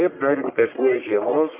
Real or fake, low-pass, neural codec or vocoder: fake; 3.6 kHz; codec, 44.1 kHz, 1.7 kbps, Pupu-Codec